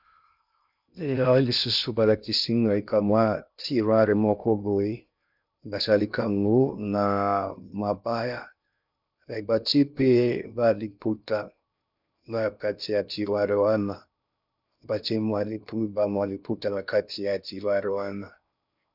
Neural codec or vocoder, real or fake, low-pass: codec, 16 kHz in and 24 kHz out, 0.6 kbps, FocalCodec, streaming, 2048 codes; fake; 5.4 kHz